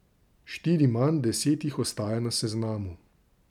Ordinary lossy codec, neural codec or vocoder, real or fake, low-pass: none; none; real; 19.8 kHz